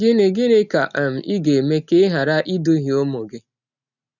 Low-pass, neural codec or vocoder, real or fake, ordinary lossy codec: 7.2 kHz; none; real; none